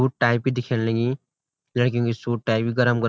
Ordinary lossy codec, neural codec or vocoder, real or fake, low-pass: none; none; real; none